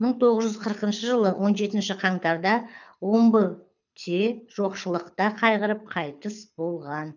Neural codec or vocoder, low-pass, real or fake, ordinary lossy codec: codec, 24 kHz, 6 kbps, HILCodec; 7.2 kHz; fake; none